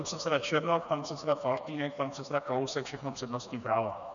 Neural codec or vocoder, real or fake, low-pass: codec, 16 kHz, 2 kbps, FreqCodec, smaller model; fake; 7.2 kHz